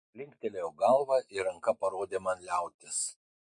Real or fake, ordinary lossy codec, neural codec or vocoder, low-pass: real; MP3, 48 kbps; none; 10.8 kHz